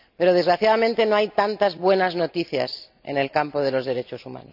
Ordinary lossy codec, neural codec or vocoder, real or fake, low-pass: none; none; real; 5.4 kHz